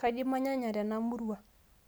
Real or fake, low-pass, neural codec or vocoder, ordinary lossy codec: real; none; none; none